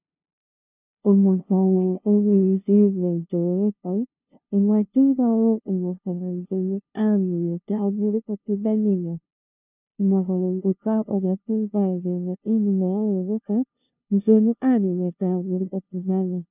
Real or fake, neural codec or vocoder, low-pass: fake; codec, 16 kHz, 0.5 kbps, FunCodec, trained on LibriTTS, 25 frames a second; 3.6 kHz